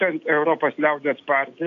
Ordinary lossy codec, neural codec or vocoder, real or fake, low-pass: AAC, 48 kbps; none; real; 7.2 kHz